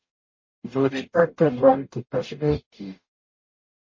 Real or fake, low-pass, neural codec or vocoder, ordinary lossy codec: fake; 7.2 kHz; codec, 44.1 kHz, 0.9 kbps, DAC; MP3, 32 kbps